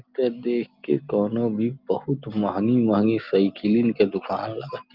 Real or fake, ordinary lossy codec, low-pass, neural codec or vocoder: real; Opus, 32 kbps; 5.4 kHz; none